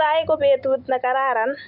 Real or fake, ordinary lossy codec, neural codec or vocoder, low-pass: real; none; none; 5.4 kHz